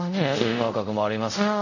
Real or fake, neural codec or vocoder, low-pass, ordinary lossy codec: fake; codec, 24 kHz, 0.5 kbps, DualCodec; 7.2 kHz; none